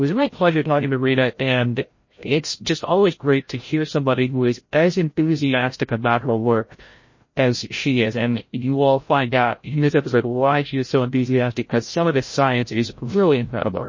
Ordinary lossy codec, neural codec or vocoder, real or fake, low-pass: MP3, 32 kbps; codec, 16 kHz, 0.5 kbps, FreqCodec, larger model; fake; 7.2 kHz